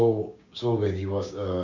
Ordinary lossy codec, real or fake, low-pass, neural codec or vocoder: none; fake; 7.2 kHz; codec, 16 kHz, 6 kbps, DAC